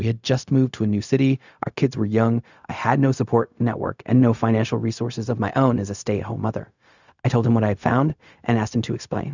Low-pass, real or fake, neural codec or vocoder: 7.2 kHz; fake; codec, 16 kHz, 0.4 kbps, LongCat-Audio-Codec